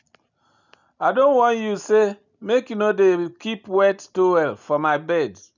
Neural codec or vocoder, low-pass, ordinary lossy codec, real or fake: none; 7.2 kHz; none; real